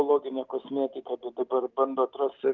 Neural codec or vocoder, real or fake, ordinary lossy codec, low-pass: none; real; Opus, 32 kbps; 7.2 kHz